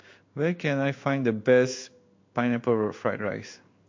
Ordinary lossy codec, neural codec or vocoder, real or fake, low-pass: MP3, 48 kbps; codec, 16 kHz in and 24 kHz out, 1 kbps, XY-Tokenizer; fake; 7.2 kHz